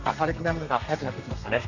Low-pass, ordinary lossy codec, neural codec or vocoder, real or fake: 7.2 kHz; none; codec, 32 kHz, 1.9 kbps, SNAC; fake